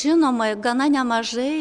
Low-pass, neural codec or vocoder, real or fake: 9.9 kHz; none; real